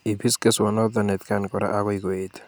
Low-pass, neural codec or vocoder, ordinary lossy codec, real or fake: none; none; none; real